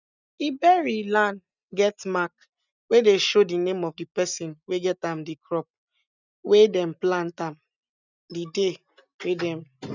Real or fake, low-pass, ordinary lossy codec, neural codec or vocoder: real; 7.2 kHz; none; none